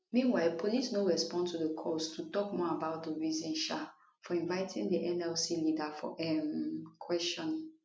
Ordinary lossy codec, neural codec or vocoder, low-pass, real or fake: none; none; none; real